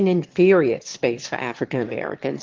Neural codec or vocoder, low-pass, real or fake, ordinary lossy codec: autoencoder, 22.05 kHz, a latent of 192 numbers a frame, VITS, trained on one speaker; 7.2 kHz; fake; Opus, 16 kbps